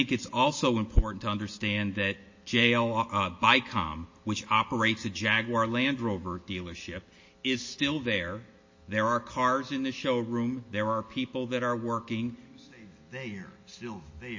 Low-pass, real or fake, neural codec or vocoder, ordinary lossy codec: 7.2 kHz; real; none; MP3, 32 kbps